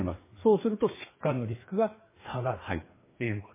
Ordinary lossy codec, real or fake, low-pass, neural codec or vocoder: MP3, 16 kbps; fake; 3.6 kHz; codec, 16 kHz, 2 kbps, FreqCodec, larger model